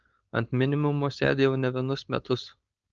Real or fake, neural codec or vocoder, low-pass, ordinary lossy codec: fake; codec, 16 kHz, 4.8 kbps, FACodec; 7.2 kHz; Opus, 24 kbps